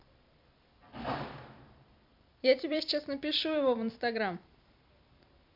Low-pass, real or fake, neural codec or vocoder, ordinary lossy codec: 5.4 kHz; fake; vocoder, 44.1 kHz, 128 mel bands every 256 samples, BigVGAN v2; MP3, 48 kbps